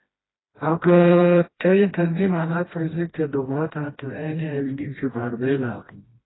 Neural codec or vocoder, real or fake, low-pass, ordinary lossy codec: codec, 16 kHz, 1 kbps, FreqCodec, smaller model; fake; 7.2 kHz; AAC, 16 kbps